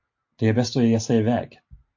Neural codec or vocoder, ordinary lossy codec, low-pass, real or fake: none; MP3, 48 kbps; 7.2 kHz; real